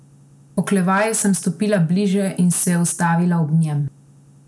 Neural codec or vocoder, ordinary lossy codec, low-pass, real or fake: none; none; none; real